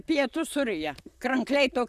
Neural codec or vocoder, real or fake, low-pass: vocoder, 44.1 kHz, 128 mel bands, Pupu-Vocoder; fake; 14.4 kHz